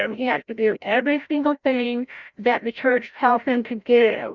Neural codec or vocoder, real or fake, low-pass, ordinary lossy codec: codec, 16 kHz, 0.5 kbps, FreqCodec, larger model; fake; 7.2 kHz; Opus, 64 kbps